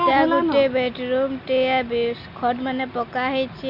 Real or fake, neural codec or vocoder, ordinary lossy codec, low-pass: real; none; none; 5.4 kHz